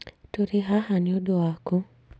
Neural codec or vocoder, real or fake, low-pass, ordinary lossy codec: none; real; none; none